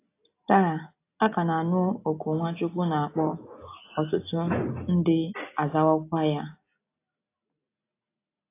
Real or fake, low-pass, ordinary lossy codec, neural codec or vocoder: real; 3.6 kHz; AAC, 24 kbps; none